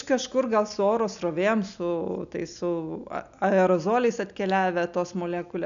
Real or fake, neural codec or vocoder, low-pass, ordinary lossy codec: real; none; 7.2 kHz; MP3, 96 kbps